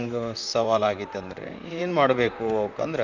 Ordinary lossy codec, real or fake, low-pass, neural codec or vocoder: none; fake; 7.2 kHz; vocoder, 44.1 kHz, 128 mel bands, Pupu-Vocoder